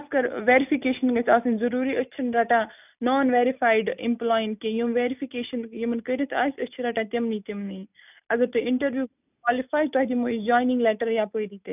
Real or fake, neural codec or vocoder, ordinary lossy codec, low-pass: real; none; none; 3.6 kHz